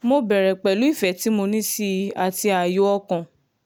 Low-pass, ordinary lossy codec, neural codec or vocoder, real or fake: none; none; none; real